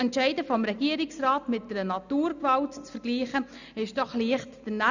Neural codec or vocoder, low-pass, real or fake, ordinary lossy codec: none; 7.2 kHz; real; none